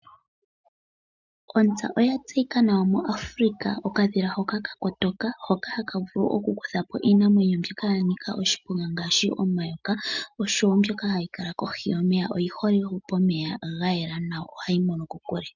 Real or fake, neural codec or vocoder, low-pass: real; none; 7.2 kHz